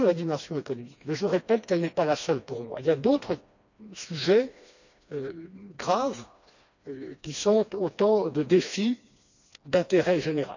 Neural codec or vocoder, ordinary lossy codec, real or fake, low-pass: codec, 16 kHz, 2 kbps, FreqCodec, smaller model; none; fake; 7.2 kHz